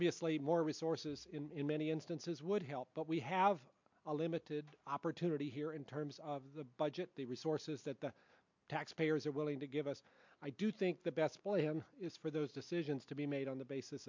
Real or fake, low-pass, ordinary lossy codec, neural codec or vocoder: real; 7.2 kHz; MP3, 64 kbps; none